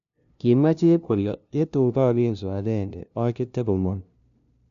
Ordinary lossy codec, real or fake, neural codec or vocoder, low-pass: none; fake; codec, 16 kHz, 0.5 kbps, FunCodec, trained on LibriTTS, 25 frames a second; 7.2 kHz